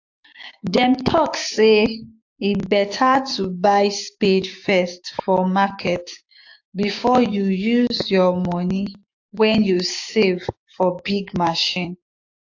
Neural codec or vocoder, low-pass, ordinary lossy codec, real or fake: codec, 16 kHz, 6 kbps, DAC; 7.2 kHz; AAC, 48 kbps; fake